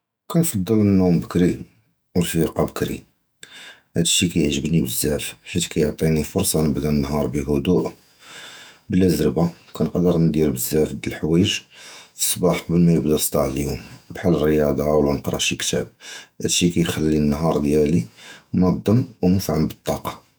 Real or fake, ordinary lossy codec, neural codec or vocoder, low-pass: fake; none; autoencoder, 48 kHz, 128 numbers a frame, DAC-VAE, trained on Japanese speech; none